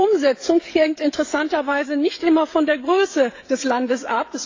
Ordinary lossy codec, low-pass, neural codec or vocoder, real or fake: AAC, 32 kbps; 7.2 kHz; codec, 16 kHz, 16 kbps, FreqCodec, smaller model; fake